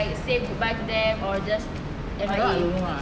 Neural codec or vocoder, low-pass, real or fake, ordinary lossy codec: none; none; real; none